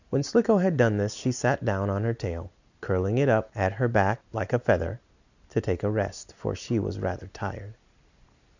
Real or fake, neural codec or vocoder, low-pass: real; none; 7.2 kHz